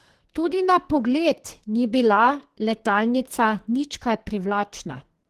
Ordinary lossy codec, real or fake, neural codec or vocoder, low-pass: Opus, 16 kbps; fake; codec, 44.1 kHz, 2.6 kbps, SNAC; 14.4 kHz